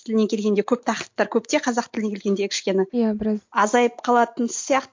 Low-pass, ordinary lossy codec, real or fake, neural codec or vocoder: 7.2 kHz; MP3, 48 kbps; real; none